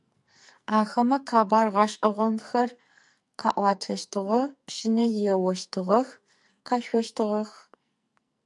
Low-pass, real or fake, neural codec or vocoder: 10.8 kHz; fake; codec, 44.1 kHz, 2.6 kbps, SNAC